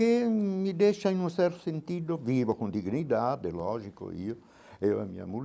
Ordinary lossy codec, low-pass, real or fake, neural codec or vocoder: none; none; real; none